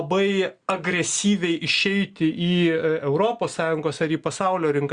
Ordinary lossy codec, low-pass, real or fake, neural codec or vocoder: Opus, 64 kbps; 9.9 kHz; real; none